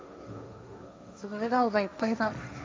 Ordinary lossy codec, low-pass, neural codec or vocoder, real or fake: none; none; codec, 16 kHz, 1.1 kbps, Voila-Tokenizer; fake